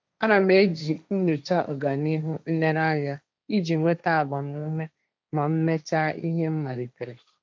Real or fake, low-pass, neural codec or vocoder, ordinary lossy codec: fake; none; codec, 16 kHz, 1.1 kbps, Voila-Tokenizer; none